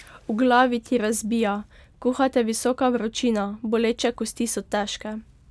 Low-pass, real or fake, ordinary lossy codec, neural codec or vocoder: none; real; none; none